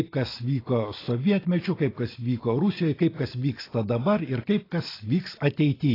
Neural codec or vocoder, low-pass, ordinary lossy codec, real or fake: none; 5.4 kHz; AAC, 24 kbps; real